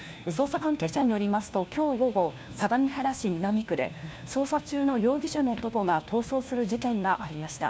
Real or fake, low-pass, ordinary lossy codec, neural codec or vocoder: fake; none; none; codec, 16 kHz, 1 kbps, FunCodec, trained on LibriTTS, 50 frames a second